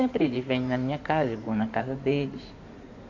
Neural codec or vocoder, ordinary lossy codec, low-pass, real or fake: codec, 16 kHz in and 24 kHz out, 2.2 kbps, FireRedTTS-2 codec; MP3, 64 kbps; 7.2 kHz; fake